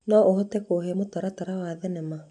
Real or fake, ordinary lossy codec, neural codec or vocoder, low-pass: real; none; none; 10.8 kHz